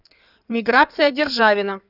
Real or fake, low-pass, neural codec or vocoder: fake; 5.4 kHz; codec, 16 kHz in and 24 kHz out, 2.2 kbps, FireRedTTS-2 codec